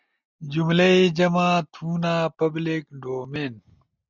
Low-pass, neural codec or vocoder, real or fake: 7.2 kHz; none; real